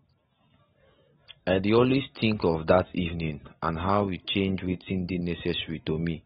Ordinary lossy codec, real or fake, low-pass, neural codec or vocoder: AAC, 16 kbps; real; 9.9 kHz; none